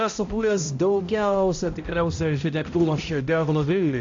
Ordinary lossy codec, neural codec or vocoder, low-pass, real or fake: AAC, 48 kbps; codec, 16 kHz, 0.5 kbps, X-Codec, HuBERT features, trained on balanced general audio; 7.2 kHz; fake